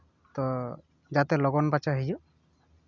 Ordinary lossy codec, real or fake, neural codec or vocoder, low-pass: none; real; none; 7.2 kHz